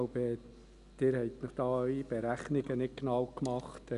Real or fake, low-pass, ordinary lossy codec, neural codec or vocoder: real; 10.8 kHz; none; none